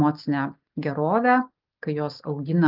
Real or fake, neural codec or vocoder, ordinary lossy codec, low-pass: real; none; Opus, 32 kbps; 5.4 kHz